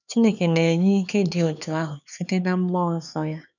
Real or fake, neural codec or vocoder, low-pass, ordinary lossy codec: fake; codec, 16 kHz, 4 kbps, X-Codec, HuBERT features, trained on LibriSpeech; 7.2 kHz; none